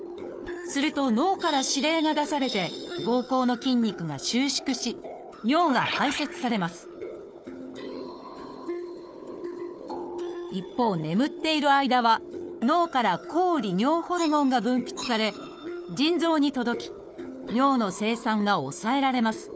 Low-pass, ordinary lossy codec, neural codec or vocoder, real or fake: none; none; codec, 16 kHz, 4 kbps, FunCodec, trained on Chinese and English, 50 frames a second; fake